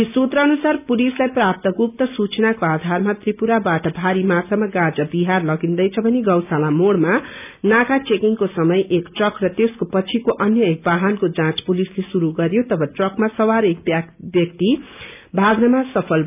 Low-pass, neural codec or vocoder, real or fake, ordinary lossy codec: 3.6 kHz; none; real; none